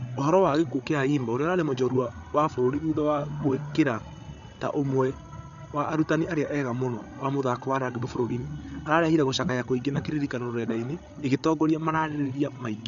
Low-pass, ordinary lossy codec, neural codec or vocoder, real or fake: 7.2 kHz; none; codec, 16 kHz, 8 kbps, FreqCodec, larger model; fake